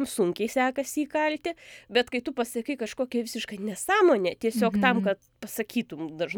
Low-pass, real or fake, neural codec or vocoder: 19.8 kHz; real; none